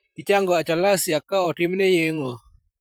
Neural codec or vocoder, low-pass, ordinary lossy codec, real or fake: vocoder, 44.1 kHz, 128 mel bands, Pupu-Vocoder; none; none; fake